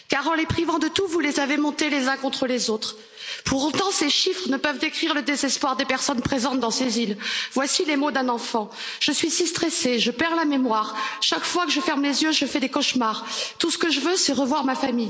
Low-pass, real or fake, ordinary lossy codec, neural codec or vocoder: none; real; none; none